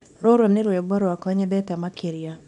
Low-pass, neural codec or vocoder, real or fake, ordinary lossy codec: 10.8 kHz; codec, 24 kHz, 0.9 kbps, WavTokenizer, small release; fake; none